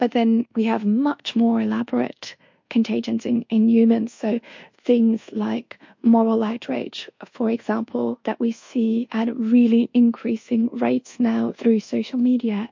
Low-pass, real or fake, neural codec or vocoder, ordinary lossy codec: 7.2 kHz; fake; codec, 24 kHz, 1.2 kbps, DualCodec; MP3, 48 kbps